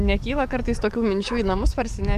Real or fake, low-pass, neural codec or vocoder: fake; 14.4 kHz; codec, 44.1 kHz, 7.8 kbps, DAC